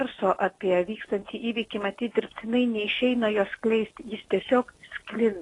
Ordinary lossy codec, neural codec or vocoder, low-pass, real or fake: AAC, 32 kbps; none; 10.8 kHz; real